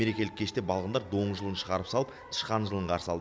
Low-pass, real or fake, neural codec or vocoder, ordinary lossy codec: none; real; none; none